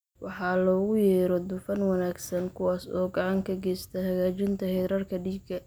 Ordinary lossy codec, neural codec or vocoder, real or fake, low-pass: none; none; real; none